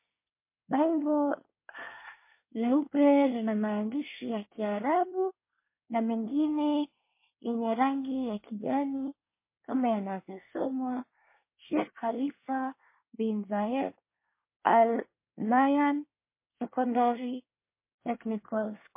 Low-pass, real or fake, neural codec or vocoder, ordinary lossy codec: 3.6 kHz; fake; codec, 24 kHz, 1 kbps, SNAC; MP3, 24 kbps